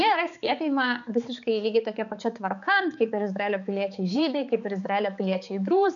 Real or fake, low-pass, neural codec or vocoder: fake; 7.2 kHz; codec, 16 kHz, 4 kbps, X-Codec, HuBERT features, trained on balanced general audio